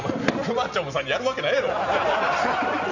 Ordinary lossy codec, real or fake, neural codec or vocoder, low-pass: none; real; none; 7.2 kHz